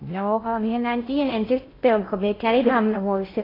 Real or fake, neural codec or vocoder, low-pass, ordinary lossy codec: fake; codec, 16 kHz in and 24 kHz out, 0.6 kbps, FocalCodec, streaming, 2048 codes; 5.4 kHz; AAC, 24 kbps